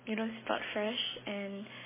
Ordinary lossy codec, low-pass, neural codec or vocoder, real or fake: MP3, 16 kbps; 3.6 kHz; none; real